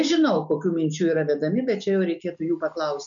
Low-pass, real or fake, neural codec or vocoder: 7.2 kHz; real; none